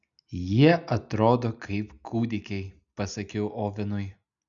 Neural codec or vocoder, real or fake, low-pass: none; real; 7.2 kHz